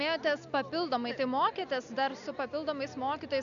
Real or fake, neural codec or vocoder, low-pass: real; none; 7.2 kHz